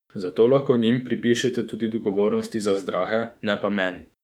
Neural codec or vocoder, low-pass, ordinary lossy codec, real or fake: autoencoder, 48 kHz, 32 numbers a frame, DAC-VAE, trained on Japanese speech; 19.8 kHz; none; fake